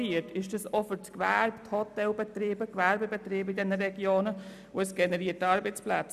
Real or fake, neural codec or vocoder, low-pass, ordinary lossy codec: real; none; 14.4 kHz; none